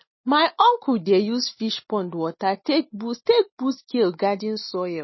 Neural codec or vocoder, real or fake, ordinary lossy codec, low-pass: none; real; MP3, 24 kbps; 7.2 kHz